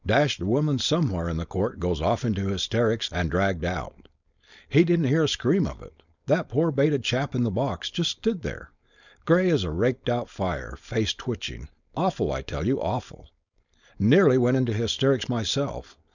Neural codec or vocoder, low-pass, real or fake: codec, 16 kHz, 4.8 kbps, FACodec; 7.2 kHz; fake